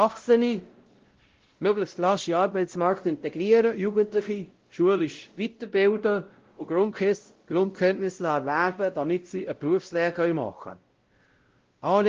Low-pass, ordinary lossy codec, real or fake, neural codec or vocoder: 7.2 kHz; Opus, 16 kbps; fake; codec, 16 kHz, 0.5 kbps, X-Codec, WavLM features, trained on Multilingual LibriSpeech